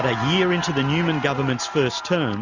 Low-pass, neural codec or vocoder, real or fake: 7.2 kHz; none; real